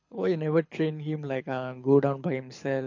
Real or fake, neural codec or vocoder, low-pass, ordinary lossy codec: fake; codec, 24 kHz, 6 kbps, HILCodec; 7.2 kHz; MP3, 48 kbps